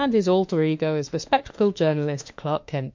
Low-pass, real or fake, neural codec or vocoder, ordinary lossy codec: 7.2 kHz; fake; autoencoder, 48 kHz, 32 numbers a frame, DAC-VAE, trained on Japanese speech; MP3, 48 kbps